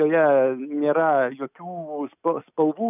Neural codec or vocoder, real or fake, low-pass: none; real; 3.6 kHz